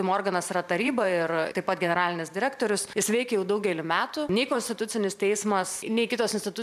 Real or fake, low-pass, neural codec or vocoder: fake; 14.4 kHz; vocoder, 44.1 kHz, 128 mel bands every 512 samples, BigVGAN v2